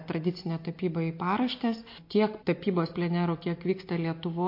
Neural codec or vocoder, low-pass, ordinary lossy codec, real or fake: none; 5.4 kHz; MP3, 32 kbps; real